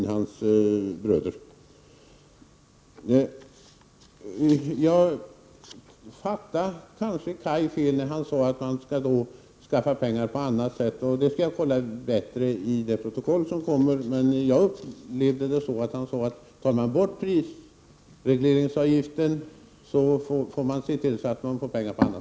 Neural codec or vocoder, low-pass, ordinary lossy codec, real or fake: none; none; none; real